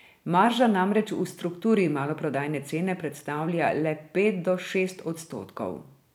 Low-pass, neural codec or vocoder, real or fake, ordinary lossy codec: 19.8 kHz; vocoder, 44.1 kHz, 128 mel bands every 512 samples, BigVGAN v2; fake; none